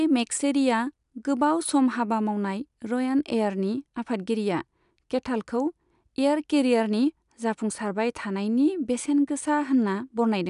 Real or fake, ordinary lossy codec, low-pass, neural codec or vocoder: real; none; 10.8 kHz; none